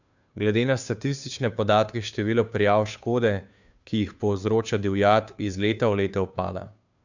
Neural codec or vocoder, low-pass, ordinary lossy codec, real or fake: codec, 16 kHz, 2 kbps, FunCodec, trained on Chinese and English, 25 frames a second; 7.2 kHz; none; fake